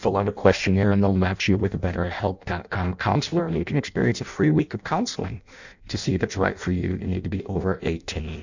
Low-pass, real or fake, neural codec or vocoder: 7.2 kHz; fake; codec, 16 kHz in and 24 kHz out, 0.6 kbps, FireRedTTS-2 codec